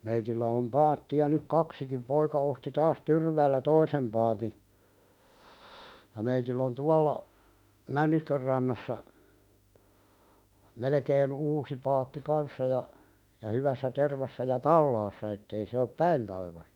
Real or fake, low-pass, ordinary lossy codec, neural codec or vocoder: fake; 19.8 kHz; none; autoencoder, 48 kHz, 32 numbers a frame, DAC-VAE, trained on Japanese speech